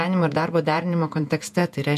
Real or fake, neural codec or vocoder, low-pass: fake; vocoder, 48 kHz, 128 mel bands, Vocos; 14.4 kHz